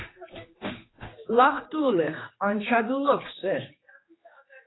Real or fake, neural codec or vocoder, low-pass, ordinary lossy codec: fake; codec, 16 kHz, 2 kbps, X-Codec, HuBERT features, trained on general audio; 7.2 kHz; AAC, 16 kbps